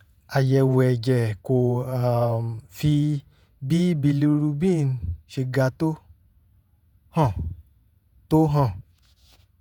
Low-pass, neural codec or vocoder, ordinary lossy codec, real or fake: 19.8 kHz; vocoder, 48 kHz, 128 mel bands, Vocos; none; fake